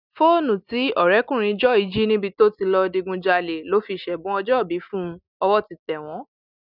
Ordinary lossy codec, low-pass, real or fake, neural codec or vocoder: none; 5.4 kHz; real; none